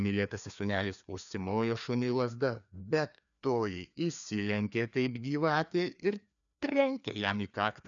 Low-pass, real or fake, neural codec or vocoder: 7.2 kHz; fake; codec, 16 kHz, 2 kbps, FreqCodec, larger model